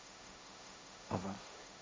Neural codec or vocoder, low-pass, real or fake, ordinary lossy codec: codec, 16 kHz, 1.1 kbps, Voila-Tokenizer; none; fake; none